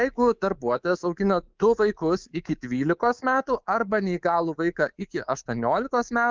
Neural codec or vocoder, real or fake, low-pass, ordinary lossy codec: codec, 24 kHz, 3.1 kbps, DualCodec; fake; 7.2 kHz; Opus, 32 kbps